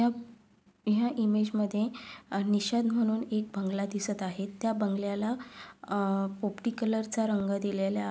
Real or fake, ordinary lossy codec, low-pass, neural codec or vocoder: real; none; none; none